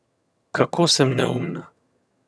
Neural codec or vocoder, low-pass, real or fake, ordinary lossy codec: vocoder, 22.05 kHz, 80 mel bands, HiFi-GAN; none; fake; none